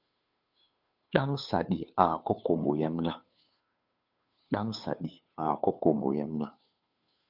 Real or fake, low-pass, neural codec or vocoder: fake; 5.4 kHz; codec, 16 kHz, 2 kbps, FunCodec, trained on Chinese and English, 25 frames a second